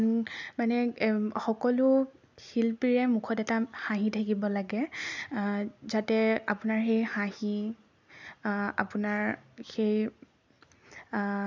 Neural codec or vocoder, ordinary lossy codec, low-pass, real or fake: none; none; 7.2 kHz; real